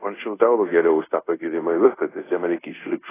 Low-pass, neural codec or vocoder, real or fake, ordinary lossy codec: 3.6 kHz; codec, 24 kHz, 0.5 kbps, DualCodec; fake; AAC, 16 kbps